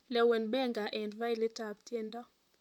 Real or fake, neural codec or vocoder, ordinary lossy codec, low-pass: fake; vocoder, 44.1 kHz, 128 mel bands, Pupu-Vocoder; none; 19.8 kHz